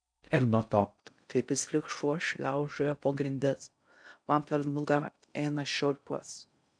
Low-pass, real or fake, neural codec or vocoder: 9.9 kHz; fake; codec, 16 kHz in and 24 kHz out, 0.6 kbps, FocalCodec, streaming, 4096 codes